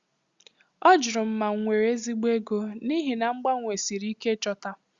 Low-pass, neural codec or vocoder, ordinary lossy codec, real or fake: 7.2 kHz; none; Opus, 64 kbps; real